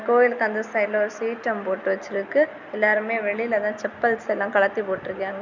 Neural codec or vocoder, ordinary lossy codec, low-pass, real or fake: none; none; 7.2 kHz; real